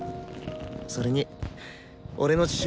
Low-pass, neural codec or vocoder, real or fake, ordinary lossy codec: none; none; real; none